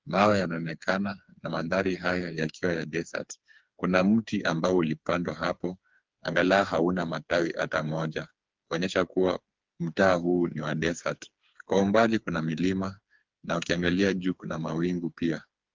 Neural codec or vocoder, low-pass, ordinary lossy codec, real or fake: codec, 16 kHz, 4 kbps, FreqCodec, smaller model; 7.2 kHz; Opus, 32 kbps; fake